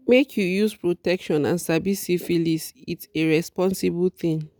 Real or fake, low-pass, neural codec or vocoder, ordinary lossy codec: real; none; none; none